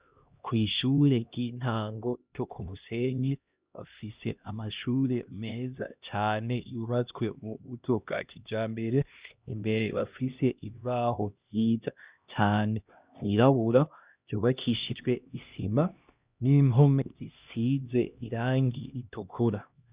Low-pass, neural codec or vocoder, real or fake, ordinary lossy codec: 3.6 kHz; codec, 16 kHz, 1 kbps, X-Codec, HuBERT features, trained on LibriSpeech; fake; Opus, 64 kbps